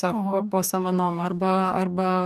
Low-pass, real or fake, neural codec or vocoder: 14.4 kHz; fake; codec, 44.1 kHz, 2.6 kbps, DAC